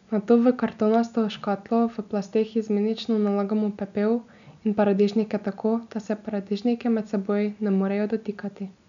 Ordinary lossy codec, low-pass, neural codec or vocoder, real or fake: none; 7.2 kHz; none; real